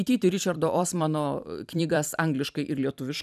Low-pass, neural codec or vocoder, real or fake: 14.4 kHz; vocoder, 44.1 kHz, 128 mel bands every 256 samples, BigVGAN v2; fake